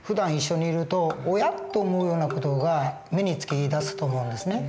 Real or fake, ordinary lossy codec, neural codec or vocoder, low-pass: real; none; none; none